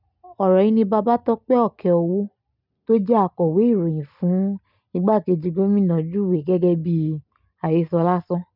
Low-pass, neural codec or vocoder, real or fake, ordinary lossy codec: 5.4 kHz; none; real; none